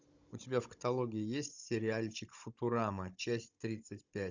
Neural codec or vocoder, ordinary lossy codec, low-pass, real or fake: codec, 16 kHz, 16 kbps, FunCodec, trained on Chinese and English, 50 frames a second; Opus, 64 kbps; 7.2 kHz; fake